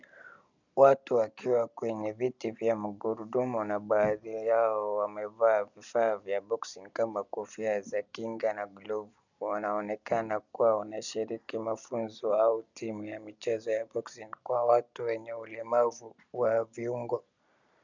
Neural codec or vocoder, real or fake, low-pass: none; real; 7.2 kHz